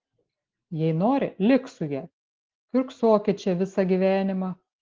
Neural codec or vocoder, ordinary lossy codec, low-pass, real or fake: none; Opus, 16 kbps; 7.2 kHz; real